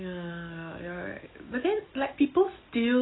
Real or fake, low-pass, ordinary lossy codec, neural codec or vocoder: real; 7.2 kHz; AAC, 16 kbps; none